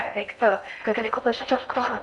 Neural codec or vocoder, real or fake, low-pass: codec, 16 kHz in and 24 kHz out, 0.6 kbps, FocalCodec, streaming, 4096 codes; fake; 10.8 kHz